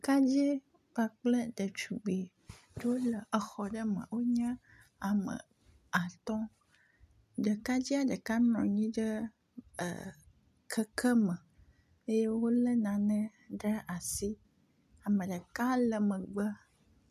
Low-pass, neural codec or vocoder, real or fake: 14.4 kHz; none; real